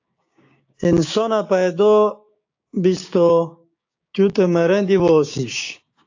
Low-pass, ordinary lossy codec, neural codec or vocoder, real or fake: 7.2 kHz; AAC, 48 kbps; codec, 16 kHz, 6 kbps, DAC; fake